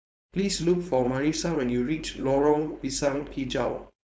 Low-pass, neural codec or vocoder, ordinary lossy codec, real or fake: none; codec, 16 kHz, 4.8 kbps, FACodec; none; fake